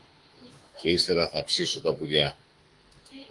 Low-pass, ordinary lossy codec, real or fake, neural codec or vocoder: 10.8 kHz; Opus, 32 kbps; fake; autoencoder, 48 kHz, 32 numbers a frame, DAC-VAE, trained on Japanese speech